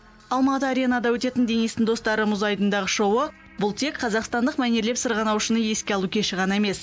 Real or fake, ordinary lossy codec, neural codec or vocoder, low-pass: real; none; none; none